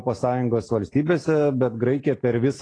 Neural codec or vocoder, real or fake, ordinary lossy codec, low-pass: none; real; AAC, 32 kbps; 9.9 kHz